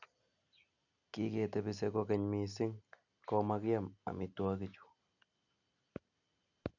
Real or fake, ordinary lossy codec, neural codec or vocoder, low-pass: real; none; none; 7.2 kHz